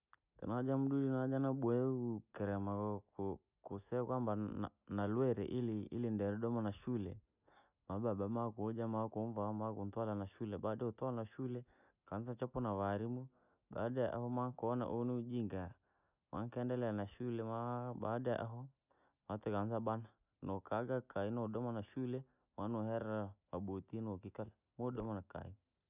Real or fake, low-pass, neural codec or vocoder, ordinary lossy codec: real; 3.6 kHz; none; none